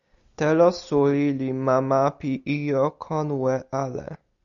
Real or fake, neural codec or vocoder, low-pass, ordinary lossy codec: real; none; 7.2 kHz; MP3, 96 kbps